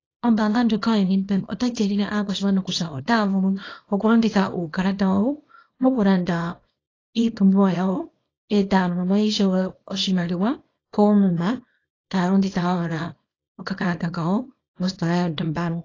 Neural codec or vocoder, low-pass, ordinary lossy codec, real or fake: codec, 24 kHz, 0.9 kbps, WavTokenizer, small release; 7.2 kHz; AAC, 32 kbps; fake